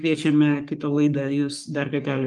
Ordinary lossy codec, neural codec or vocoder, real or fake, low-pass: Opus, 32 kbps; codec, 44.1 kHz, 3.4 kbps, Pupu-Codec; fake; 10.8 kHz